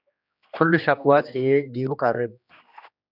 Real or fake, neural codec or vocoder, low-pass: fake; codec, 16 kHz, 2 kbps, X-Codec, HuBERT features, trained on general audio; 5.4 kHz